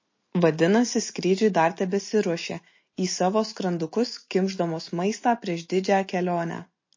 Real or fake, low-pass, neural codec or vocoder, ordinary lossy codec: real; 7.2 kHz; none; MP3, 32 kbps